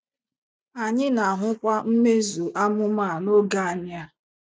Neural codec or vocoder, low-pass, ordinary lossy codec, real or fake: none; none; none; real